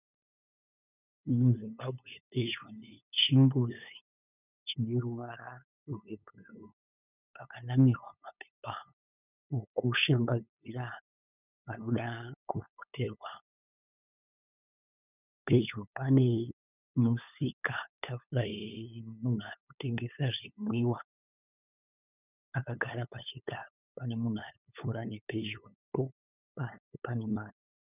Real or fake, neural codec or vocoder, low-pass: fake; codec, 16 kHz, 8 kbps, FunCodec, trained on LibriTTS, 25 frames a second; 3.6 kHz